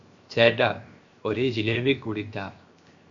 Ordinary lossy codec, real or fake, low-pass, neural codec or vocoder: MP3, 48 kbps; fake; 7.2 kHz; codec, 16 kHz, 0.7 kbps, FocalCodec